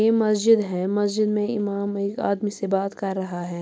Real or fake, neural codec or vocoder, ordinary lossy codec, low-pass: real; none; none; none